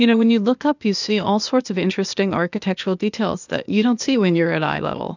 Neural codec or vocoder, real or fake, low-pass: codec, 16 kHz, 0.8 kbps, ZipCodec; fake; 7.2 kHz